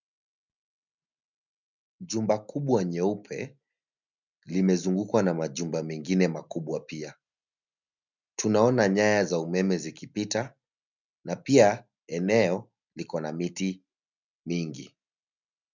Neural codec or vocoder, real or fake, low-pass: none; real; 7.2 kHz